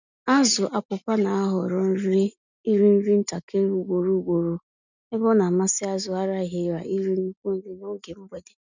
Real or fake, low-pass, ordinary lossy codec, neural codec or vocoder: real; 7.2 kHz; none; none